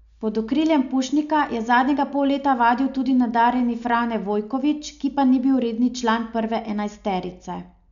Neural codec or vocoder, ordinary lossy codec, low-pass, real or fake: none; none; 7.2 kHz; real